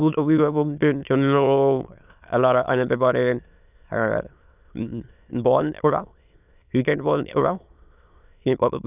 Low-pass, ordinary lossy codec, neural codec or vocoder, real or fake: 3.6 kHz; none; autoencoder, 22.05 kHz, a latent of 192 numbers a frame, VITS, trained on many speakers; fake